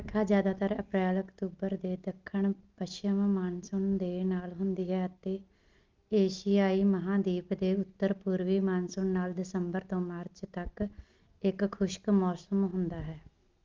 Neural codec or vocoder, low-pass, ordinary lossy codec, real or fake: none; 7.2 kHz; Opus, 24 kbps; real